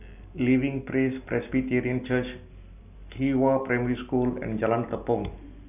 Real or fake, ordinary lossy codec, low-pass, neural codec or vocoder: real; none; 3.6 kHz; none